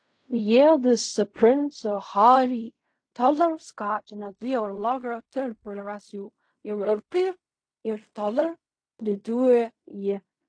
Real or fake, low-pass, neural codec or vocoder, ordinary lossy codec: fake; 9.9 kHz; codec, 16 kHz in and 24 kHz out, 0.4 kbps, LongCat-Audio-Codec, fine tuned four codebook decoder; AAC, 48 kbps